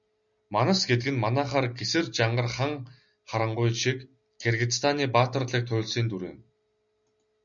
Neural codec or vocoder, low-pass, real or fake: none; 7.2 kHz; real